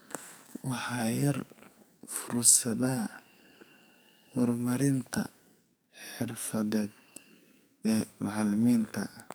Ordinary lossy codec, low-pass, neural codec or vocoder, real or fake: none; none; codec, 44.1 kHz, 2.6 kbps, SNAC; fake